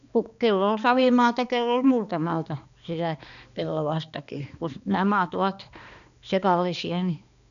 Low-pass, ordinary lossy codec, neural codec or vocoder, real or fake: 7.2 kHz; none; codec, 16 kHz, 2 kbps, X-Codec, HuBERT features, trained on balanced general audio; fake